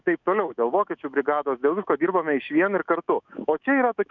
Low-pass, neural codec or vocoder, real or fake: 7.2 kHz; autoencoder, 48 kHz, 128 numbers a frame, DAC-VAE, trained on Japanese speech; fake